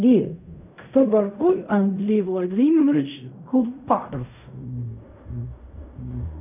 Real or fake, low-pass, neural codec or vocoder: fake; 3.6 kHz; codec, 16 kHz in and 24 kHz out, 0.4 kbps, LongCat-Audio-Codec, fine tuned four codebook decoder